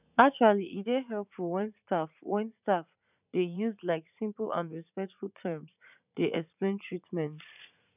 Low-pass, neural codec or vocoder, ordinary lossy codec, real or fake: 3.6 kHz; vocoder, 44.1 kHz, 80 mel bands, Vocos; none; fake